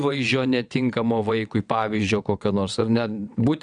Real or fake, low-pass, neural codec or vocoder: fake; 9.9 kHz; vocoder, 22.05 kHz, 80 mel bands, WaveNeXt